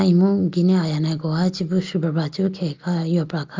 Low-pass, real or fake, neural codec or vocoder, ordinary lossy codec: 7.2 kHz; real; none; Opus, 32 kbps